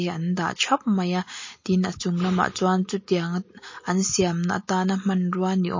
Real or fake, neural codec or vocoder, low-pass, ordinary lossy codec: real; none; 7.2 kHz; MP3, 32 kbps